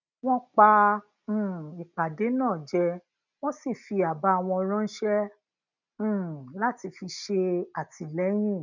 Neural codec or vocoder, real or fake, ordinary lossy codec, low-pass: none; real; none; 7.2 kHz